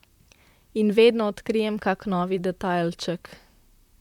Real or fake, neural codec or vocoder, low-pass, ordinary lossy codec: fake; vocoder, 44.1 kHz, 128 mel bands, Pupu-Vocoder; 19.8 kHz; MP3, 96 kbps